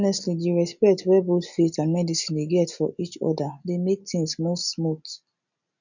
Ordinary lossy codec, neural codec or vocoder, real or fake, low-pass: none; none; real; 7.2 kHz